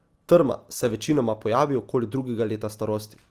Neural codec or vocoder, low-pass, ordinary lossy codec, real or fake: none; 14.4 kHz; Opus, 24 kbps; real